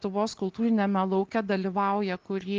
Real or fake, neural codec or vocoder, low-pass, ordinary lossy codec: real; none; 7.2 kHz; Opus, 16 kbps